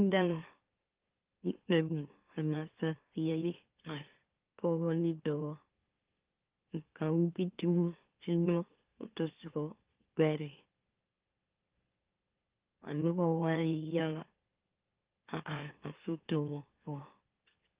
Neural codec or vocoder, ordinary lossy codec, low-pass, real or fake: autoencoder, 44.1 kHz, a latent of 192 numbers a frame, MeloTTS; Opus, 32 kbps; 3.6 kHz; fake